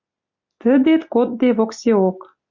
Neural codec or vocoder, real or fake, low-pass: none; real; 7.2 kHz